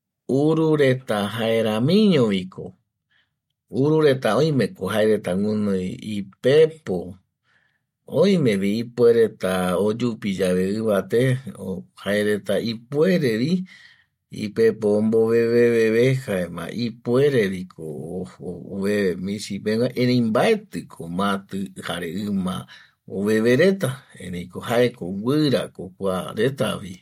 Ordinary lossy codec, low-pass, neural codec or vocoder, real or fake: MP3, 64 kbps; 19.8 kHz; none; real